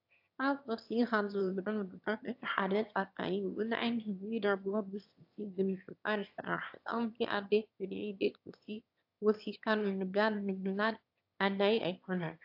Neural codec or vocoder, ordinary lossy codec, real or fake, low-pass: autoencoder, 22.05 kHz, a latent of 192 numbers a frame, VITS, trained on one speaker; AAC, 48 kbps; fake; 5.4 kHz